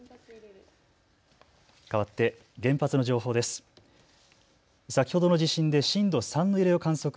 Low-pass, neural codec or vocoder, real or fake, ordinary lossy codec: none; none; real; none